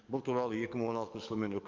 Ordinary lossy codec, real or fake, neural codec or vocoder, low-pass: Opus, 24 kbps; fake; codec, 44.1 kHz, 7.8 kbps, DAC; 7.2 kHz